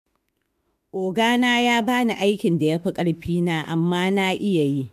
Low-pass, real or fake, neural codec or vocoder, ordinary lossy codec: 14.4 kHz; fake; autoencoder, 48 kHz, 32 numbers a frame, DAC-VAE, trained on Japanese speech; MP3, 96 kbps